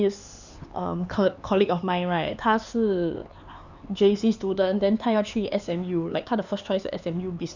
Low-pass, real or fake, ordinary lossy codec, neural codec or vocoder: 7.2 kHz; fake; none; codec, 16 kHz, 4 kbps, X-Codec, HuBERT features, trained on LibriSpeech